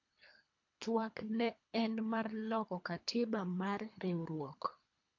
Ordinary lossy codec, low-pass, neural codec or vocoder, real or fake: AAC, 48 kbps; 7.2 kHz; codec, 24 kHz, 3 kbps, HILCodec; fake